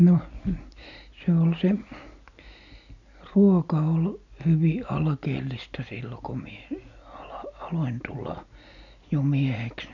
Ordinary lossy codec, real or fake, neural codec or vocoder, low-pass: none; real; none; 7.2 kHz